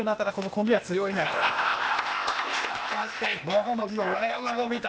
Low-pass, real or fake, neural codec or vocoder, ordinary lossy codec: none; fake; codec, 16 kHz, 0.8 kbps, ZipCodec; none